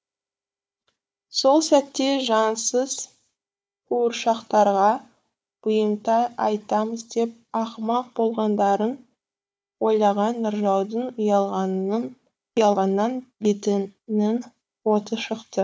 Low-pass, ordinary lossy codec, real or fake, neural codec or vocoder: none; none; fake; codec, 16 kHz, 4 kbps, FunCodec, trained on Chinese and English, 50 frames a second